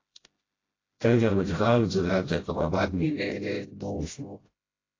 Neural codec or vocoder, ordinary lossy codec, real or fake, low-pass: codec, 16 kHz, 0.5 kbps, FreqCodec, smaller model; AAC, 32 kbps; fake; 7.2 kHz